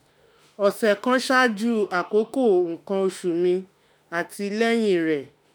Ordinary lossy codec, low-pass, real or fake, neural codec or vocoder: none; none; fake; autoencoder, 48 kHz, 32 numbers a frame, DAC-VAE, trained on Japanese speech